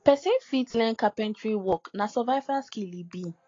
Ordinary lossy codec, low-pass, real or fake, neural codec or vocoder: AAC, 32 kbps; 7.2 kHz; real; none